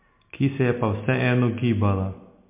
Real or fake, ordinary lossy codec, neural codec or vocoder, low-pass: real; MP3, 24 kbps; none; 3.6 kHz